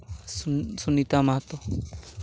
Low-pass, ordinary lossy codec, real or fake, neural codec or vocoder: none; none; real; none